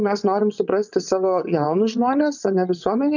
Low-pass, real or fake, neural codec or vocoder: 7.2 kHz; fake; vocoder, 22.05 kHz, 80 mel bands, WaveNeXt